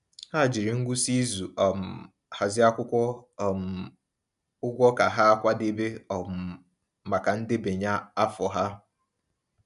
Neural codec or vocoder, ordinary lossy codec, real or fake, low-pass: none; none; real; 10.8 kHz